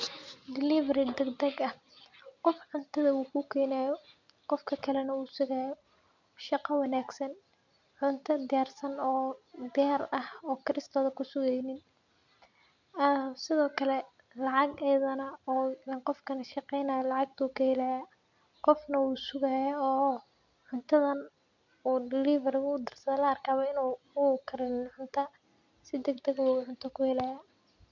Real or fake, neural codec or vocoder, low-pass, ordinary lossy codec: real; none; 7.2 kHz; none